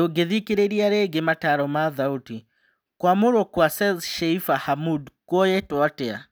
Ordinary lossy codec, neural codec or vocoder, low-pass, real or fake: none; none; none; real